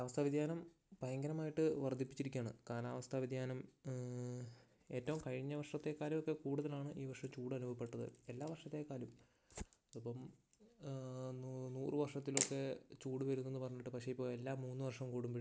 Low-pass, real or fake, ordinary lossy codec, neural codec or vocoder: none; real; none; none